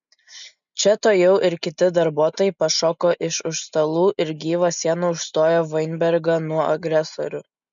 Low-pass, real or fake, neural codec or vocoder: 7.2 kHz; real; none